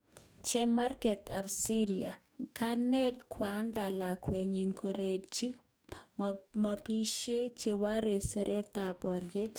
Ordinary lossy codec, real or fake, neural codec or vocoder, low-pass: none; fake; codec, 44.1 kHz, 2.6 kbps, DAC; none